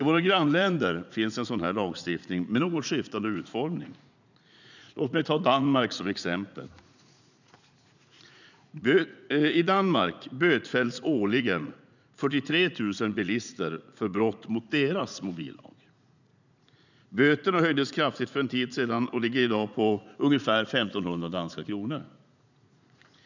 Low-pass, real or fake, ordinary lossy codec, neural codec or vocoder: 7.2 kHz; fake; none; vocoder, 44.1 kHz, 80 mel bands, Vocos